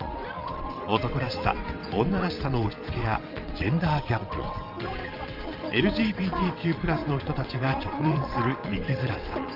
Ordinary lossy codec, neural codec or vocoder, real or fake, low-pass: Opus, 32 kbps; vocoder, 22.05 kHz, 80 mel bands, WaveNeXt; fake; 5.4 kHz